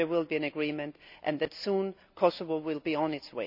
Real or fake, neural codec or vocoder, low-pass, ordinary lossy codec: real; none; 5.4 kHz; none